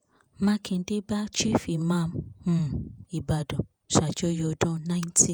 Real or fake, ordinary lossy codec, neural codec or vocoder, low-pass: fake; none; vocoder, 48 kHz, 128 mel bands, Vocos; none